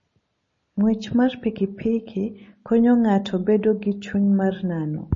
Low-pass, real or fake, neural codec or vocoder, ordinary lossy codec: 7.2 kHz; real; none; MP3, 32 kbps